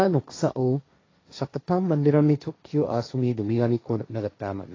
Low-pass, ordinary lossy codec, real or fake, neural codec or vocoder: 7.2 kHz; AAC, 32 kbps; fake; codec, 16 kHz, 1.1 kbps, Voila-Tokenizer